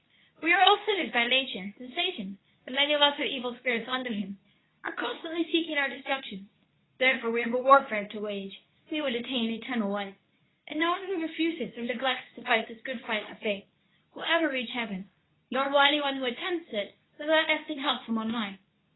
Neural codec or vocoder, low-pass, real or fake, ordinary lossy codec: codec, 24 kHz, 0.9 kbps, WavTokenizer, medium speech release version 2; 7.2 kHz; fake; AAC, 16 kbps